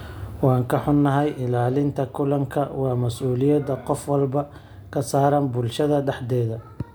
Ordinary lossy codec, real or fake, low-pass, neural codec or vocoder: none; real; none; none